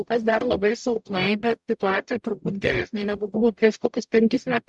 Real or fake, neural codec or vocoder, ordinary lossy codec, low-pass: fake; codec, 44.1 kHz, 0.9 kbps, DAC; MP3, 96 kbps; 10.8 kHz